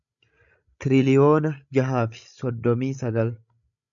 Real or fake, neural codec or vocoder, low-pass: fake; codec, 16 kHz, 16 kbps, FreqCodec, larger model; 7.2 kHz